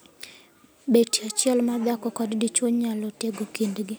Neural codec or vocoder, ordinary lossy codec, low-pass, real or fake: none; none; none; real